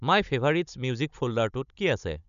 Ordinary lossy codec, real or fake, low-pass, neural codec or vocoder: none; fake; 7.2 kHz; codec, 16 kHz, 16 kbps, FunCodec, trained on Chinese and English, 50 frames a second